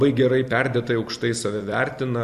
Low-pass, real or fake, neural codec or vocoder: 14.4 kHz; fake; vocoder, 44.1 kHz, 128 mel bands every 256 samples, BigVGAN v2